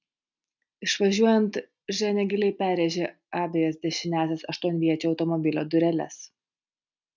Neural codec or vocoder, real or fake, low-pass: none; real; 7.2 kHz